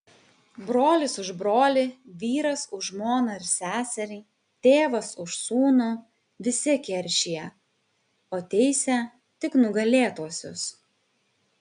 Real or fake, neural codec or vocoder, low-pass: real; none; 9.9 kHz